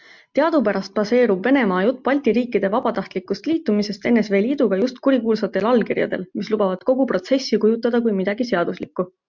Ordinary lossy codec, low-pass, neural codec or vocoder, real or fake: MP3, 64 kbps; 7.2 kHz; none; real